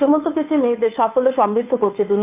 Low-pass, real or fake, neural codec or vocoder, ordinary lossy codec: 3.6 kHz; fake; codec, 16 kHz, 2 kbps, FunCodec, trained on Chinese and English, 25 frames a second; AAC, 32 kbps